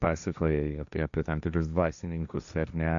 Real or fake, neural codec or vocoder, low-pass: fake; codec, 16 kHz, 1.1 kbps, Voila-Tokenizer; 7.2 kHz